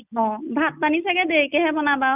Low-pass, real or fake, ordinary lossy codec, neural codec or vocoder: 3.6 kHz; real; none; none